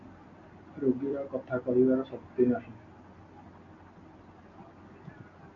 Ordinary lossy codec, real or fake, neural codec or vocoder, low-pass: AAC, 48 kbps; real; none; 7.2 kHz